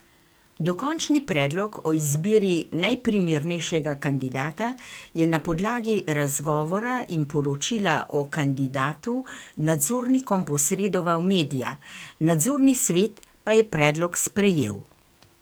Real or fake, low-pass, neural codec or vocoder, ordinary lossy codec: fake; none; codec, 44.1 kHz, 2.6 kbps, SNAC; none